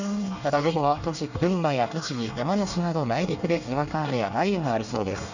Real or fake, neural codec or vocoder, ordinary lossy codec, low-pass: fake; codec, 24 kHz, 1 kbps, SNAC; none; 7.2 kHz